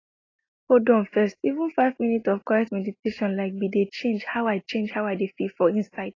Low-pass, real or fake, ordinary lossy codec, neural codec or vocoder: 7.2 kHz; real; AAC, 32 kbps; none